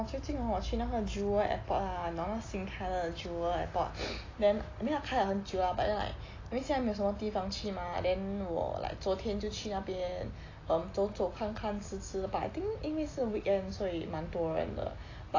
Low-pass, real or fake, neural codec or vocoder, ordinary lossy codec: 7.2 kHz; real; none; AAC, 32 kbps